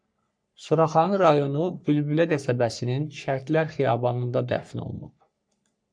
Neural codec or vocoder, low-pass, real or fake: codec, 44.1 kHz, 3.4 kbps, Pupu-Codec; 9.9 kHz; fake